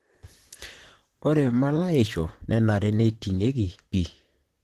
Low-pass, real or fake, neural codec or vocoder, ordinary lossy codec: 14.4 kHz; fake; vocoder, 44.1 kHz, 128 mel bands, Pupu-Vocoder; Opus, 16 kbps